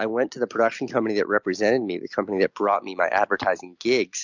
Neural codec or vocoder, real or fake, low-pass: none; real; 7.2 kHz